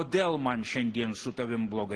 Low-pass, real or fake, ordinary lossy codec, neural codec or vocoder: 10.8 kHz; real; Opus, 16 kbps; none